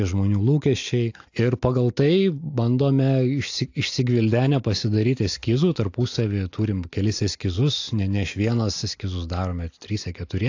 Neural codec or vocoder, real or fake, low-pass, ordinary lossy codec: none; real; 7.2 kHz; AAC, 48 kbps